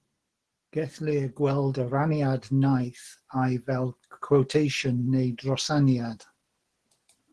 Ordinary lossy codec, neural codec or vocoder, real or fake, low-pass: Opus, 16 kbps; none; real; 10.8 kHz